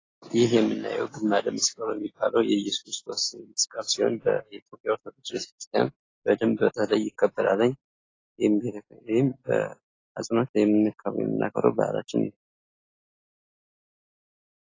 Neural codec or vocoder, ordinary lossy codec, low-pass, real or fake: none; AAC, 32 kbps; 7.2 kHz; real